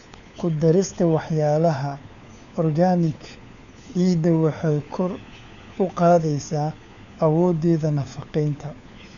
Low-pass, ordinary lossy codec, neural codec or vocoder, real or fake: 7.2 kHz; none; codec, 16 kHz, 4 kbps, FunCodec, trained on LibriTTS, 50 frames a second; fake